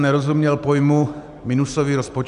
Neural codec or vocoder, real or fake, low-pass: none; real; 10.8 kHz